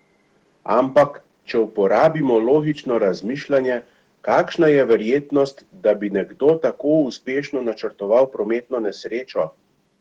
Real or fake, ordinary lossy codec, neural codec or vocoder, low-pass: fake; Opus, 16 kbps; autoencoder, 48 kHz, 128 numbers a frame, DAC-VAE, trained on Japanese speech; 19.8 kHz